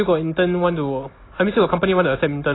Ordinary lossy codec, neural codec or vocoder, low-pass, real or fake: AAC, 16 kbps; none; 7.2 kHz; real